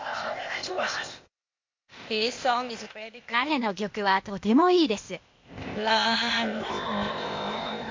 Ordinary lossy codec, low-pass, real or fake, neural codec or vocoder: MP3, 48 kbps; 7.2 kHz; fake; codec, 16 kHz, 0.8 kbps, ZipCodec